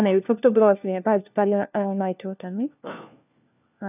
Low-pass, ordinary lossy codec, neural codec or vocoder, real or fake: 3.6 kHz; none; codec, 16 kHz, 1 kbps, FunCodec, trained on LibriTTS, 50 frames a second; fake